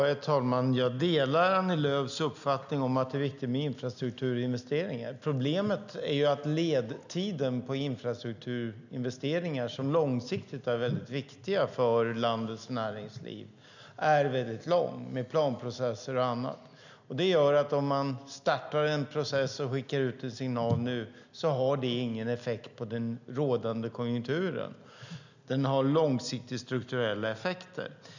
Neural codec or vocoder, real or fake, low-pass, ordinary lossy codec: none; real; 7.2 kHz; none